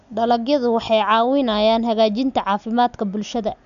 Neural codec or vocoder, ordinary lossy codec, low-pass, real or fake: none; none; 7.2 kHz; real